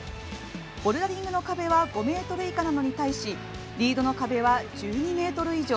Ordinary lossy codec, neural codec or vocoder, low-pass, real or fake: none; none; none; real